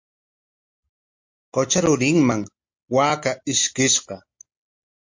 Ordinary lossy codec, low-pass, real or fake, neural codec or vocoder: MP3, 48 kbps; 7.2 kHz; real; none